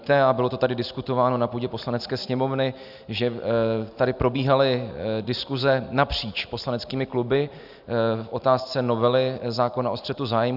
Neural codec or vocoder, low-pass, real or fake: none; 5.4 kHz; real